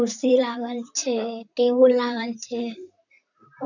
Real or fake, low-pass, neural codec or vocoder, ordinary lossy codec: fake; 7.2 kHz; vocoder, 44.1 kHz, 128 mel bands, Pupu-Vocoder; none